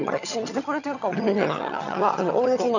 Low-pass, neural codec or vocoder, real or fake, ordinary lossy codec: 7.2 kHz; vocoder, 22.05 kHz, 80 mel bands, HiFi-GAN; fake; none